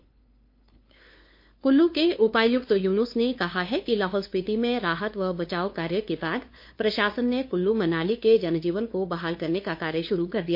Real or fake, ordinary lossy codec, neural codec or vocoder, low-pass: fake; MP3, 32 kbps; codec, 16 kHz, 2 kbps, FunCodec, trained on LibriTTS, 25 frames a second; 5.4 kHz